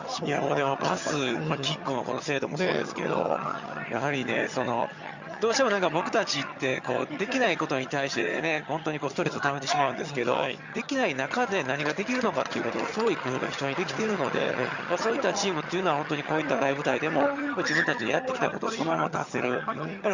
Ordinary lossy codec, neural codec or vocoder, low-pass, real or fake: Opus, 64 kbps; vocoder, 22.05 kHz, 80 mel bands, HiFi-GAN; 7.2 kHz; fake